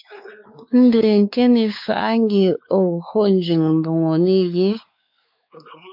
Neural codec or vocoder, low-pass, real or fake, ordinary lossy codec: codec, 16 kHz, 4 kbps, X-Codec, WavLM features, trained on Multilingual LibriSpeech; 5.4 kHz; fake; MP3, 48 kbps